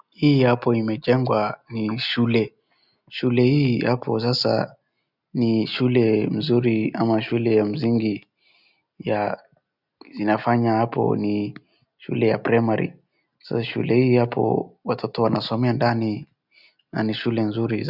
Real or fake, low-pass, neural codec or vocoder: real; 5.4 kHz; none